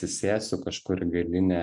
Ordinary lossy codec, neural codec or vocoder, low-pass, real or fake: MP3, 64 kbps; none; 10.8 kHz; real